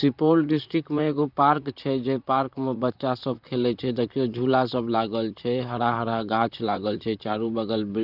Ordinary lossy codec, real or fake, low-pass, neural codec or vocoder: none; fake; 5.4 kHz; vocoder, 44.1 kHz, 128 mel bands, Pupu-Vocoder